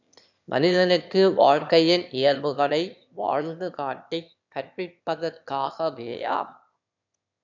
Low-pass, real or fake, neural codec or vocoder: 7.2 kHz; fake; autoencoder, 22.05 kHz, a latent of 192 numbers a frame, VITS, trained on one speaker